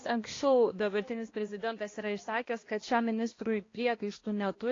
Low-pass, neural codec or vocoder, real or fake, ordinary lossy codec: 7.2 kHz; codec, 16 kHz, 1 kbps, X-Codec, HuBERT features, trained on balanced general audio; fake; AAC, 32 kbps